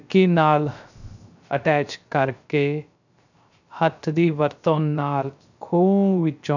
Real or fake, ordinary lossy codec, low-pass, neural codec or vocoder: fake; none; 7.2 kHz; codec, 16 kHz, 0.3 kbps, FocalCodec